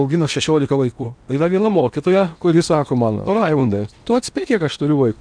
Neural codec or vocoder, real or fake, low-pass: codec, 16 kHz in and 24 kHz out, 0.8 kbps, FocalCodec, streaming, 65536 codes; fake; 9.9 kHz